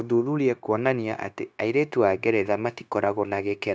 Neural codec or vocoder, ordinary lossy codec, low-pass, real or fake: codec, 16 kHz, 0.9 kbps, LongCat-Audio-Codec; none; none; fake